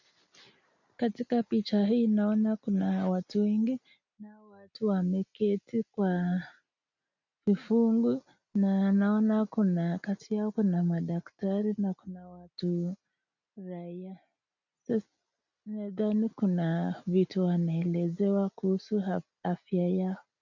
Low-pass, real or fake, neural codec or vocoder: 7.2 kHz; real; none